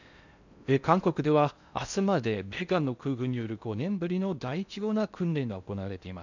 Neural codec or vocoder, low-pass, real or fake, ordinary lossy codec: codec, 16 kHz in and 24 kHz out, 0.6 kbps, FocalCodec, streaming, 4096 codes; 7.2 kHz; fake; none